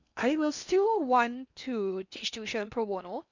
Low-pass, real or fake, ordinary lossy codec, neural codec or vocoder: 7.2 kHz; fake; none; codec, 16 kHz in and 24 kHz out, 0.8 kbps, FocalCodec, streaming, 65536 codes